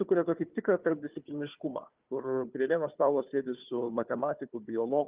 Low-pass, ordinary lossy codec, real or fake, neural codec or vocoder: 3.6 kHz; Opus, 32 kbps; fake; codec, 16 kHz, 4 kbps, FunCodec, trained on Chinese and English, 50 frames a second